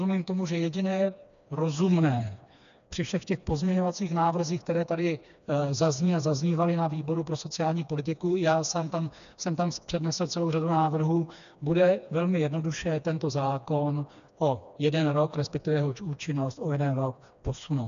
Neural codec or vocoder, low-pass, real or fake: codec, 16 kHz, 2 kbps, FreqCodec, smaller model; 7.2 kHz; fake